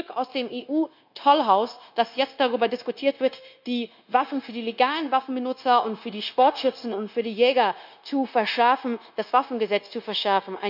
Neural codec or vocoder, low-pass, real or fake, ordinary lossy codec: codec, 16 kHz, 0.9 kbps, LongCat-Audio-Codec; 5.4 kHz; fake; none